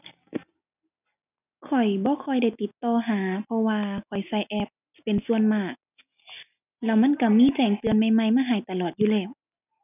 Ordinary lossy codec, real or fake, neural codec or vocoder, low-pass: none; real; none; 3.6 kHz